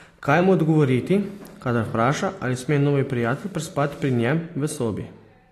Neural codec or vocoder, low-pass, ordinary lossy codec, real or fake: none; 14.4 kHz; AAC, 48 kbps; real